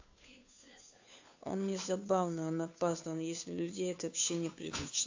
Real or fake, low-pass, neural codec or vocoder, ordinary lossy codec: fake; 7.2 kHz; codec, 16 kHz, 2 kbps, FunCodec, trained on Chinese and English, 25 frames a second; AAC, 48 kbps